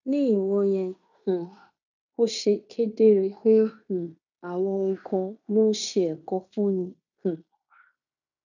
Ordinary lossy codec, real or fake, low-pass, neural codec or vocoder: none; fake; 7.2 kHz; codec, 16 kHz in and 24 kHz out, 0.9 kbps, LongCat-Audio-Codec, fine tuned four codebook decoder